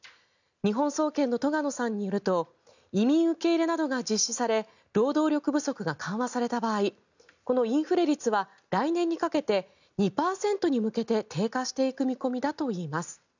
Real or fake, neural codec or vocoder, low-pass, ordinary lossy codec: real; none; 7.2 kHz; none